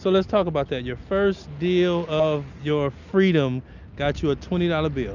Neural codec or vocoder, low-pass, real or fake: none; 7.2 kHz; real